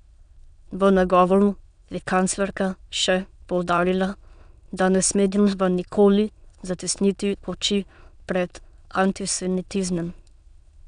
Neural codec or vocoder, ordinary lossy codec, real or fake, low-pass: autoencoder, 22.05 kHz, a latent of 192 numbers a frame, VITS, trained on many speakers; none; fake; 9.9 kHz